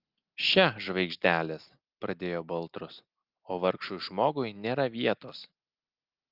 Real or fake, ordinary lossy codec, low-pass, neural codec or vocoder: real; Opus, 32 kbps; 5.4 kHz; none